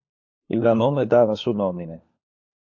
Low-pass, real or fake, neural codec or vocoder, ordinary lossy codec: 7.2 kHz; fake; codec, 16 kHz, 4 kbps, FunCodec, trained on LibriTTS, 50 frames a second; AAC, 48 kbps